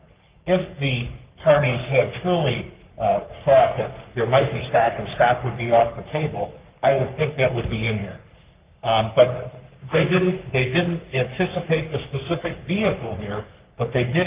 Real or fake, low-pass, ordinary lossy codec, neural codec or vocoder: fake; 3.6 kHz; Opus, 24 kbps; codec, 44.1 kHz, 3.4 kbps, Pupu-Codec